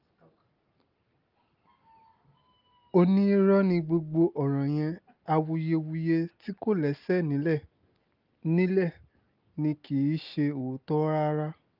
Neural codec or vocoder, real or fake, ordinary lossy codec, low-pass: none; real; Opus, 24 kbps; 5.4 kHz